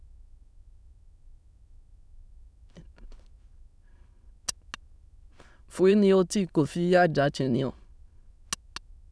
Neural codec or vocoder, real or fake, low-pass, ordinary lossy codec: autoencoder, 22.05 kHz, a latent of 192 numbers a frame, VITS, trained on many speakers; fake; none; none